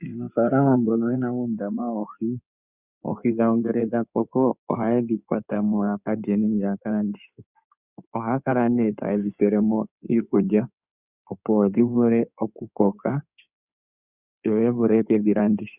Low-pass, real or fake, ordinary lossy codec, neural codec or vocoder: 3.6 kHz; fake; Opus, 64 kbps; codec, 16 kHz in and 24 kHz out, 2.2 kbps, FireRedTTS-2 codec